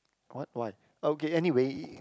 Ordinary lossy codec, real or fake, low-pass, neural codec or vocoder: none; real; none; none